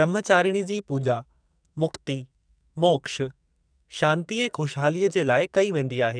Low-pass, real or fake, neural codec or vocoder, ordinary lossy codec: 9.9 kHz; fake; codec, 44.1 kHz, 2.6 kbps, SNAC; none